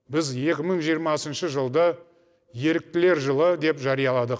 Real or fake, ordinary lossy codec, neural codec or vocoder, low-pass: real; none; none; none